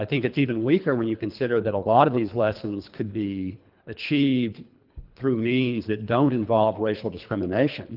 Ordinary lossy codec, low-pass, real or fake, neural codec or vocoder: Opus, 32 kbps; 5.4 kHz; fake; codec, 24 kHz, 3 kbps, HILCodec